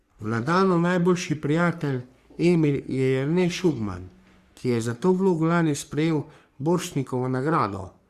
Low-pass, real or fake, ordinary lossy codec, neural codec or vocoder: 14.4 kHz; fake; Opus, 64 kbps; codec, 44.1 kHz, 3.4 kbps, Pupu-Codec